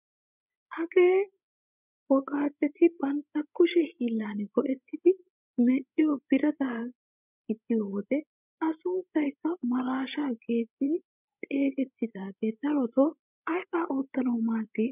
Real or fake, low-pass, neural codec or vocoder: fake; 3.6 kHz; codec, 16 kHz, 16 kbps, FreqCodec, larger model